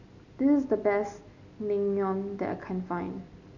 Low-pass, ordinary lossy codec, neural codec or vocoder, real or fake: 7.2 kHz; none; none; real